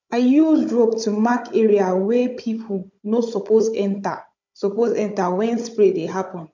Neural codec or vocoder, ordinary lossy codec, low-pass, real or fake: codec, 16 kHz, 16 kbps, FunCodec, trained on Chinese and English, 50 frames a second; MP3, 48 kbps; 7.2 kHz; fake